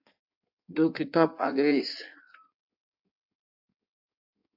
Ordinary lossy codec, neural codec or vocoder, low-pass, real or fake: AAC, 48 kbps; codec, 16 kHz in and 24 kHz out, 1.1 kbps, FireRedTTS-2 codec; 5.4 kHz; fake